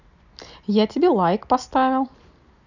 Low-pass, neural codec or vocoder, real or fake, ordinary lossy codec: 7.2 kHz; none; real; none